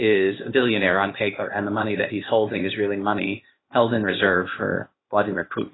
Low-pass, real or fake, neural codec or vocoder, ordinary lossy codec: 7.2 kHz; fake; codec, 16 kHz, 0.8 kbps, ZipCodec; AAC, 16 kbps